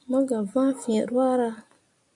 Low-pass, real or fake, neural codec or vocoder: 10.8 kHz; real; none